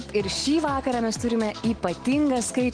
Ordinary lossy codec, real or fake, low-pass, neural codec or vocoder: Opus, 16 kbps; real; 9.9 kHz; none